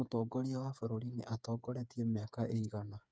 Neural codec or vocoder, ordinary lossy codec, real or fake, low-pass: codec, 16 kHz, 8 kbps, FreqCodec, smaller model; none; fake; none